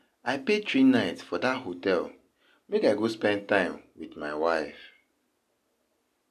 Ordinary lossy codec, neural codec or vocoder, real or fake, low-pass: none; none; real; 14.4 kHz